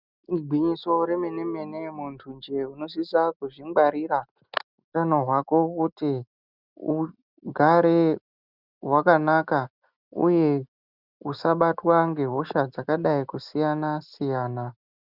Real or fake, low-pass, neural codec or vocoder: real; 5.4 kHz; none